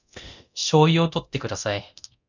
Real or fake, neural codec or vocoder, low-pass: fake; codec, 24 kHz, 0.9 kbps, DualCodec; 7.2 kHz